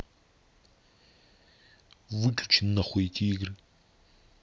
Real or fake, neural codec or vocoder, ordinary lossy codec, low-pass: real; none; none; none